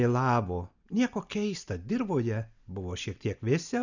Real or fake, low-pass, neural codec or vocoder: real; 7.2 kHz; none